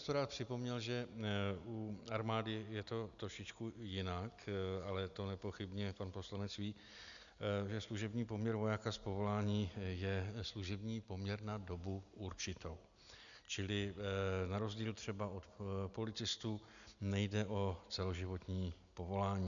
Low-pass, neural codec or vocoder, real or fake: 7.2 kHz; none; real